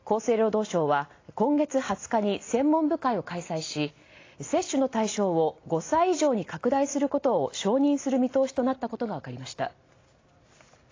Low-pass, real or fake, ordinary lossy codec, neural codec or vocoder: 7.2 kHz; real; AAC, 32 kbps; none